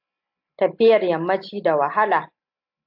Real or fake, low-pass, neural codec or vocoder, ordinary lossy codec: real; 5.4 kHz; none; AAC, 48 kbps